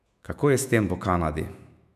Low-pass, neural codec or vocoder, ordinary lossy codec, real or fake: 14.4 kHz; autoencoder, 48 kHz, 128 numbers a frame, DAC-VAE, trained on Japanese speech; none; fake